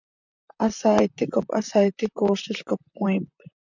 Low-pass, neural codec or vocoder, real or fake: 7.2 kHz; vocoder, 44.1 kHz, 128 mel bands, Pupu-Vocoder; fake